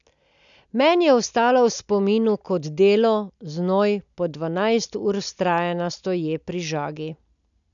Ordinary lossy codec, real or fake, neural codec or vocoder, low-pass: none; real; none; 7.2 kHz